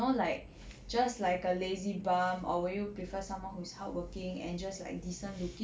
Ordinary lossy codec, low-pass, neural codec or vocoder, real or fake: none; none; none; real